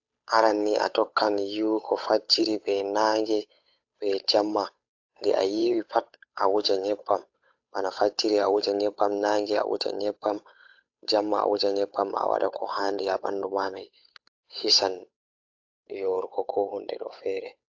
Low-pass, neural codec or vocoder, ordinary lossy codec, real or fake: 7.2 kHz; codec, 16 kHz, 8 kbps, FunCodec, trained on Chinese and English, 25 frames a second; AAC, 48 kbps; fake